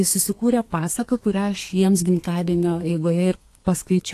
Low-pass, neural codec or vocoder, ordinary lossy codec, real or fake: 14.4 kHz; codec, 32 kHz, 1.9 kbps, SNAC; AAC, 64 kbps; fake